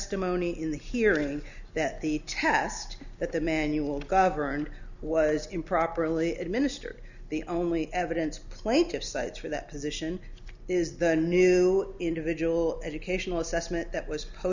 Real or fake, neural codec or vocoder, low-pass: real; none; 7.2 kHz